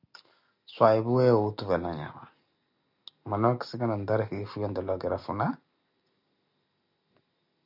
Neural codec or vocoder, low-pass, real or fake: none; 5.4 kHz; real